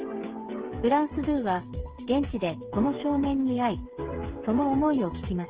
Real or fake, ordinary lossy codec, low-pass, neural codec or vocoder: fake; Opus, 16 kbps; 3.6 kHz; codec, 16 kHz, 16 kbps, FreqCodec, smaller model